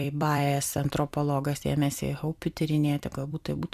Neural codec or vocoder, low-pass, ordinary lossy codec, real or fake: vocoder, 44.1 kHz, 128 mel bands every 256 samples, BigVGAN v2; 14.4 kHz; MP3, 96 kbps; fake